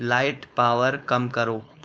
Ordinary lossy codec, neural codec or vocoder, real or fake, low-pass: none; codec, 16 kHz, 4.8 kbps, FACodec; fake; none